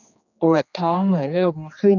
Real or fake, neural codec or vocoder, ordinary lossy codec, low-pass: fake; codec, 16 kHz, 2 kbps, X-Codec, HuBERT features, trained on general audio; none; 7.2 kHz